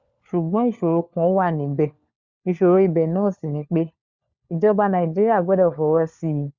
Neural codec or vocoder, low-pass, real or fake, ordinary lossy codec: codec, 16 kHz, 4 kbps, FunCodec, trained on LibriTTS, 50 frames a second; 7.2 kHz; fake; Opus, 64 kbps